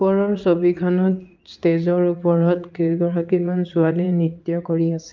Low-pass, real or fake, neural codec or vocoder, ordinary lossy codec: 7.2 kHz; fake; vocoder, 44.1 kHz, 80 mel bands, Vocos; Opus, 24 kbps